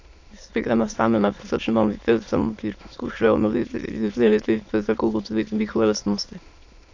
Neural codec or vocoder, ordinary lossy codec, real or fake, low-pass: autoencoder, 22.05 kHz, a latent of 192 numbers a frame, VITS, trained on many speakers; AAC, 48 kbps; fake; 7.2 kHz